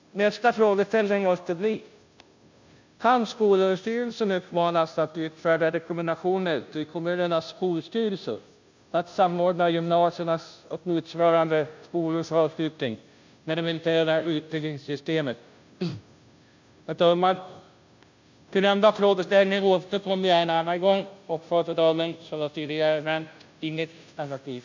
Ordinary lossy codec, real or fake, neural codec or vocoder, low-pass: MP3, 64 kbps; fake; codec, 16 kHz, 0.5 kbps, FunCodec, trained on Chinese and English, 25 frames a second; 7.2 kHz